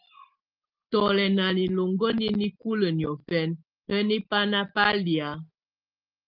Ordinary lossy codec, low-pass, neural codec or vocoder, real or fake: Opus, 24 kbps; 5.4 kHz; none; real